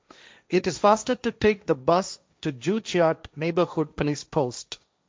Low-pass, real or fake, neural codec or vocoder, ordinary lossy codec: none; fake; codec, 16 kHz, 1.1 kbps, Voila-Tokenizer; none